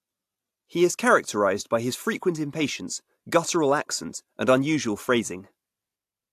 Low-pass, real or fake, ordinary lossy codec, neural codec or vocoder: 14.4 kHz; real; AAC, 64 kbps; none